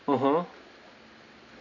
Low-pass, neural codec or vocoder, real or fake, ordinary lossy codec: 7.2 kHz; none; real; none